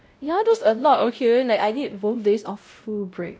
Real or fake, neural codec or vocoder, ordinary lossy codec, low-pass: fake; codec, 16 kHz, 0.5 kbps, X-Codec, WavLM features, trained on Multilingual LibriSpeech; none; none